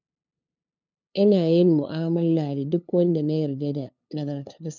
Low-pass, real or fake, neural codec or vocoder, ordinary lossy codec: 7.2 kHz; fake; codec, 16 kHz, 2 kbps, FunCodec, trained on LibriTTS, 25 frames a second; none